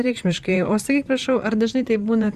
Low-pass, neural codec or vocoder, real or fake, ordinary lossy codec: 14.4 kHz; vocoder, 44.1 kHz, 128 mel bands, Pupu-Vocoder; fake; Opus, 64 kbps